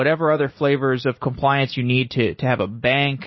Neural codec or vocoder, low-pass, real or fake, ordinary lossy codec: none; 7.2 kHz; real; MP3, 24 kbps